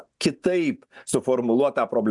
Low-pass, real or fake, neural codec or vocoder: 10.8 kHz; real; none